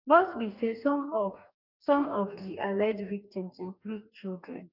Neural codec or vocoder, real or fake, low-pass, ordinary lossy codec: codec, 44.1 kHz, 2.6 kbps, DAC; fake; 5.4 kHz; none